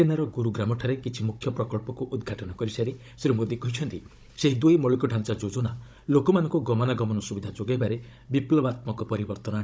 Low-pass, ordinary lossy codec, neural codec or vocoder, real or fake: none; none; codec, 16 kHz, 16 kbps, FunCodec, trained on Chinese and English, 50 frames a second; fake